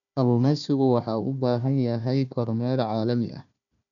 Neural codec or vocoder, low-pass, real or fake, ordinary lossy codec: codec, 16 kHz, 1 kbps, FunCodec, trained on Chinese and English, 50 frames a second; 7.2 kHz; fake; none